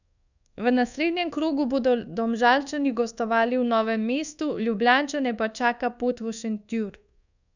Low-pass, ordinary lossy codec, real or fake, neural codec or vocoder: 7.2 kHz; none; fake; codec, 24 kHz, 1.2 kbps, DualCodec